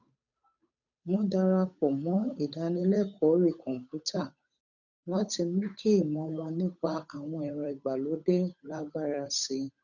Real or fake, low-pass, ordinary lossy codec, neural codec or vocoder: fake; 7.2 kHz; none; codec, 16 kHz, 8 kbps, FunCodec, trained on Chinese and English, 25 frames a second